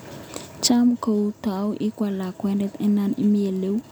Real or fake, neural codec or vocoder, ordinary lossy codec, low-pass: real; none; none; none